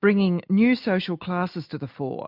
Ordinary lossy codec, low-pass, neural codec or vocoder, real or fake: MP3, 48 kbps; 5.4 kHz; none; real